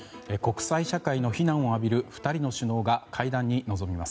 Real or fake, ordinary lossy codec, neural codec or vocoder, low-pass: real; none; none; none